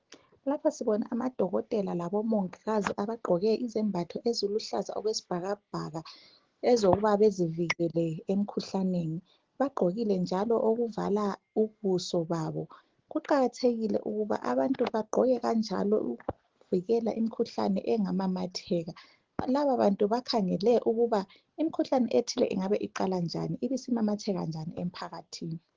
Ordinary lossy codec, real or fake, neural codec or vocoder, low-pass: Opus, 16 kbps; real; none; 7.2 kHz